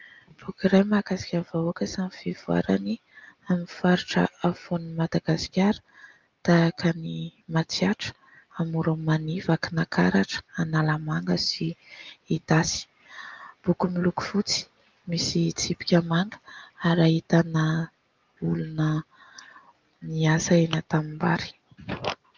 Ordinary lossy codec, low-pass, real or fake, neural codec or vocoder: Opus, 32 kbps; 7.2 kHz; real; none